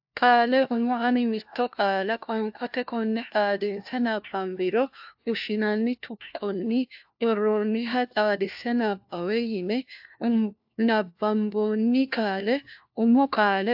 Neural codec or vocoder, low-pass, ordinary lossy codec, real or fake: codec, 16 kHz, 1 kbps, FunCodec, trained on LibriTTS, 50 frames a second; 5.4 kHz; AAC, 48 kbps; fake